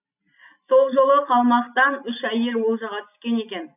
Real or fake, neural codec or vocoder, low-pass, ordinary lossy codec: real; none; 3.6 kHz; none